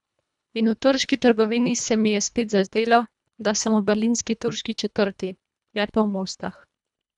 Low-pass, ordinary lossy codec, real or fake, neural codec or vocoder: 10.8 kHz; none; fake; codec, 24 kHz, 1.5 kbps, HILCodec